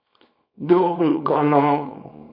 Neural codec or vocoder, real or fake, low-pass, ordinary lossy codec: codec, 24 kHz, 0.9 kbps, WavTokenizer, small release; fake; 5.4 kHz; MP3, 32 kbps